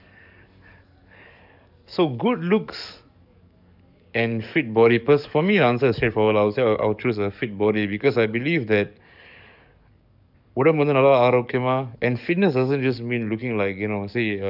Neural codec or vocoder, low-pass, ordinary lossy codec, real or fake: codec, 44.1 kHz, 7.8 kbps, DAC; 5.4 kHz; none; fake